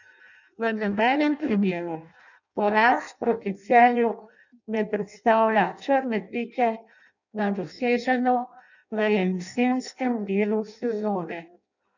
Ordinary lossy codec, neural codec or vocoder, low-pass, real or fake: none; codec, 16 kHz in and 24 kHz out, 0.6 kbps, FireRedTTS-2 codec; 7.2 kHz; fake